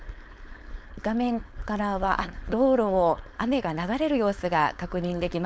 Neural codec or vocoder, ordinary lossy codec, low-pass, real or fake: codec, 16 kHz, 4.8 kbps, FACodec; none; none; fake